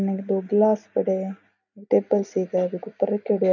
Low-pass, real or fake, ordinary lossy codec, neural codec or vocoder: 7.2 kHz; real; none; none